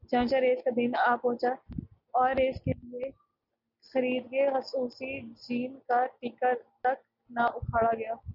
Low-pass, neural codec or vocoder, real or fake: 5.4 kHz; none; real